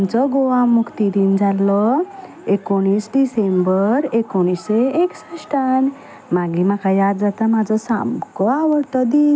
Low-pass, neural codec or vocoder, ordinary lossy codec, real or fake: none; none; none; real